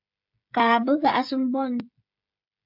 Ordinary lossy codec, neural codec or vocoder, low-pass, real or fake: AAC, 48 kbps; codec, 16 kHz, 16 kbps, FreqCodec, smaller model; 5.4 kHz; fake